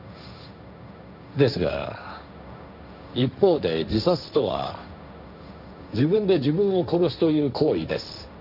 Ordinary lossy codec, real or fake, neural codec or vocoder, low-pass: none; fake; codec, 16 kHz, 1.1 kbps, Voila-Tokenizer; 5.4 kHz